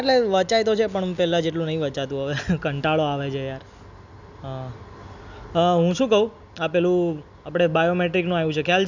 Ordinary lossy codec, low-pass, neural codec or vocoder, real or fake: none; 7.2 kHz; none; real